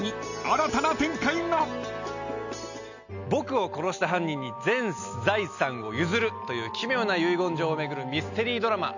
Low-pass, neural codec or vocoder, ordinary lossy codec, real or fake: 7.2 kHz; none; none; real